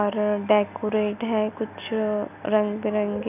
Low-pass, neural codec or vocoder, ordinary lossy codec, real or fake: 3.6 kHz; none; none; real